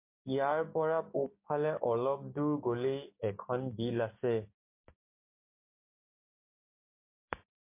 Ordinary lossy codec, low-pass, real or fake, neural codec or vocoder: MP3, 24 kbps; 3.6 kHz; fake; codec, 16 kHz, 6 kbps, DAC